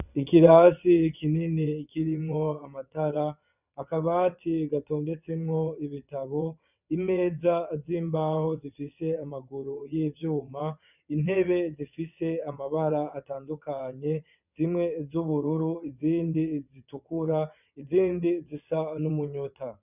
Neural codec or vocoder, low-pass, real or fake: vocoder, 22.05 kHz, 80 mel bands, WaveNeXt; 3.6 kHz; fake